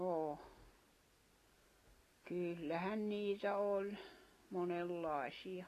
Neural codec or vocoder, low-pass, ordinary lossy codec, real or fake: none; 14.4 kHz; AAC, 48 kbps; real